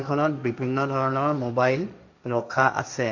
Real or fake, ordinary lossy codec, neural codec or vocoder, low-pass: fake; none; codec, 16 kHz, 1.1 kbps, Voila-Tokenizer; 7.2 kHz